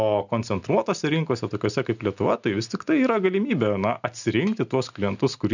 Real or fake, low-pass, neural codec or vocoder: real; 7.2 kHz; none